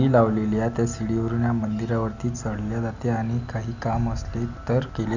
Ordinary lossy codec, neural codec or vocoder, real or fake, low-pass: none; none; real; 7.2 kHz